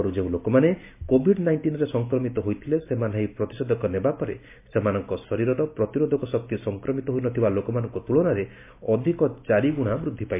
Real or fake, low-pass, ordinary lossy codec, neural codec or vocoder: real; 3.6 kHz; MP3, 24 kbps; none